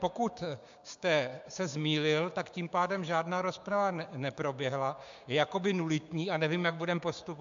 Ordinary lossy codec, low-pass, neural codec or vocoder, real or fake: MP3, 64 kbps; 7.2 kHz; codec, 16 kHz, 6 kbps, DAC; fake